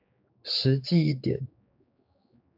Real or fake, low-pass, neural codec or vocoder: fake; 5.4 kHz; codec, 16 kHz, 4 kbps, X-Codec, HuBERT features, trained on general audio